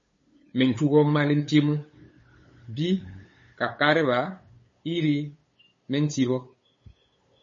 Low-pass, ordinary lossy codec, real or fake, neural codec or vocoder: 7.2 kHz; MP3, 32 kbps; fake; codec, 16 kHz, 8 kbps, FunCodec, trained on LibriTTS, 25 frames a second